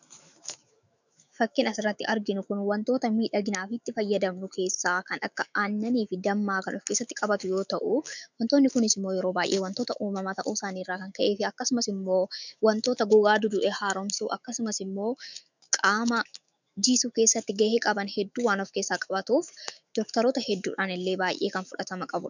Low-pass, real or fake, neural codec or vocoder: 7.2 kHz; fake; autoencoder, 48 kHz, 128 numbers a frame, DAC-VAE, trained on Japanese speech